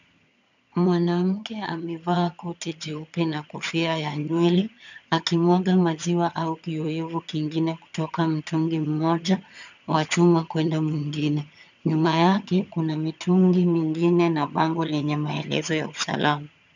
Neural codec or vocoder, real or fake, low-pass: vocoder, 22.05 kHz, 80 mel bands, HiFi-GAN; fake; 7.2 kHz